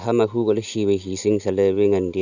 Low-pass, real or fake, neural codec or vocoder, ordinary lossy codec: 7.2 kHz; real; none; none